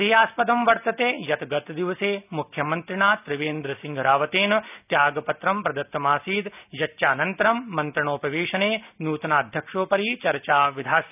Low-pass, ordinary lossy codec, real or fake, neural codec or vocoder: 3.6 kHz; none; real; none